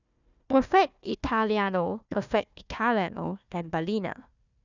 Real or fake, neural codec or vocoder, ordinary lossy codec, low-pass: fake; codec, 16 kHz, 1 kbps, FunCodec, trained on Chinese and English, 50 frames a second; none; 7.2 kHz